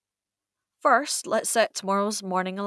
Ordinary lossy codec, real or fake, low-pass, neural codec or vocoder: none; real; none; none